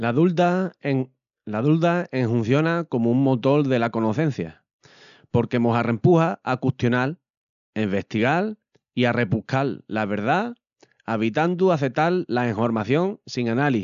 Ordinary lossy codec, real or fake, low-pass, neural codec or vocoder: none; real; 7.2 kHz; none